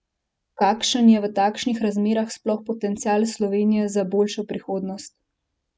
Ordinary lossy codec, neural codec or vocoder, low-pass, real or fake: none; none; none; real